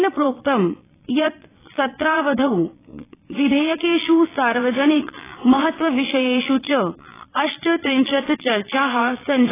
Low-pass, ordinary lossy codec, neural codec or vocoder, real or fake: 3.6 kHz; AAC, 16 kbps; vocoder, 22.05 kHz, 80 mel bands, Vocos; fake